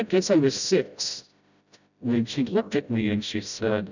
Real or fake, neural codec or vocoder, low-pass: fake; codec, 16 kHz, 0.5 kbps, FreqCodec, smaller model; 7.2 kHz